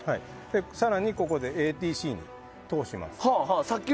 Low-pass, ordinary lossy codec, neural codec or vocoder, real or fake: none; none; none; real